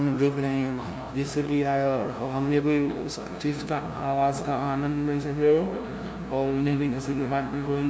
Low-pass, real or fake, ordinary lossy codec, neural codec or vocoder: none; fake; none; codec, 16 kHz, 0.5 kbps, FunCodec, trained on LibriTTS, 25 frames a second